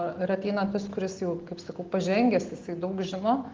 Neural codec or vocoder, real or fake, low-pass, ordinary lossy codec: none; real; 7.2 kHz; Opus, 16 kbps